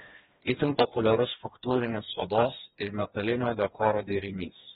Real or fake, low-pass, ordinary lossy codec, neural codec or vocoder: fake; 7.2 kHz; AAC, 16 kbps; codec, 16 kHz, 2 kbps, FreqCodec, smaller model